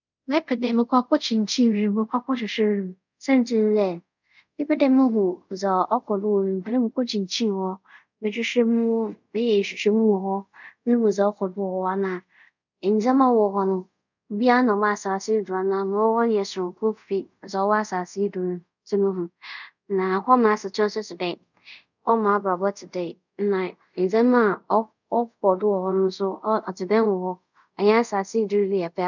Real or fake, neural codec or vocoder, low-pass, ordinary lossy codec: fake; codec, 24 kHz, 0.5 kbps, DualCodec; 7.2 kHz; none